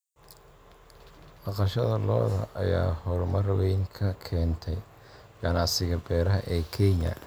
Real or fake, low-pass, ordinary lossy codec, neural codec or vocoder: real; none; none; none